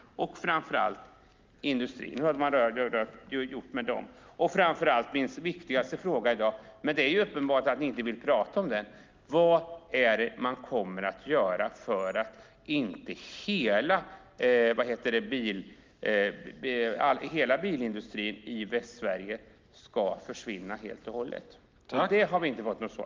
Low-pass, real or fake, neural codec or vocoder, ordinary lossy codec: 7.2 kHz; real; none; Opus, 32 kbps